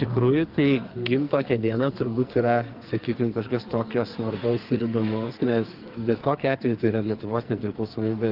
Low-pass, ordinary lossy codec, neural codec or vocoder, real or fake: 5.4 kHz; Opus, 32 kbps; codec, 44.1 kHz, 2.6 kbps, SNAC; fake